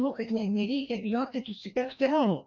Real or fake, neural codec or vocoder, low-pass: fake; codec, 16 kHz, 1 kbps, FreqCodec, larger model; 7.2 kHz